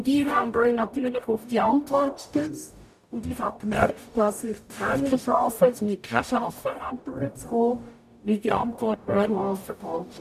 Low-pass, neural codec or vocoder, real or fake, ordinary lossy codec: 14.4 kHz; codec, 44.1 kHz, 0.9 kbps, DAC; fake; none